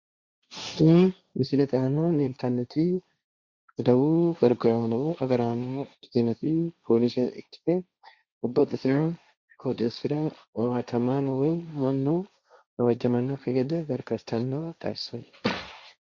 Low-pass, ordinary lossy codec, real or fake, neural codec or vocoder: 7.2 kHz; Opus, 64 kbps; fake; codec, 16 kHz, 1.1 kbps, Voila-Tokenizer